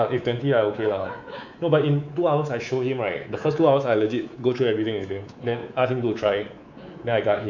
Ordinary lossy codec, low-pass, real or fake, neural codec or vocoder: none; 7.2 kHz; fake; codec, 24 kHz, 3.1 kbps, DualCodec